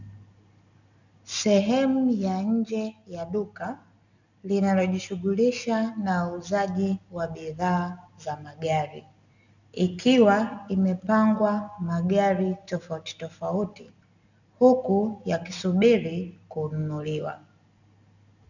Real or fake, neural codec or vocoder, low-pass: real; none; 7.2 kHz